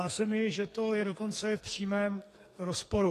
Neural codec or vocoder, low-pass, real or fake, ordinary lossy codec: codec, 44.1 kHz, 2.6 kbps, SNAC; 14.4 kHz; fake; AAC, 48 kbps